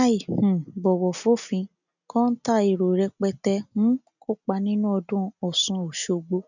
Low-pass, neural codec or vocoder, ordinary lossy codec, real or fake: 7.2 kHz; none; none; real